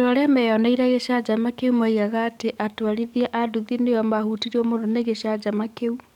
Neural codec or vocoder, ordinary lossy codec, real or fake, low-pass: codec, 44.1 kHz, 7.8 kbps, DAC; none; fake; 19.8 kHz